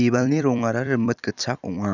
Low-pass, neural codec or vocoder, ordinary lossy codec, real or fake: 7.2 kHz; vocoder, 44.1 kHz, 128 mel bands every 256 samples, BigVGAN v2; none; fake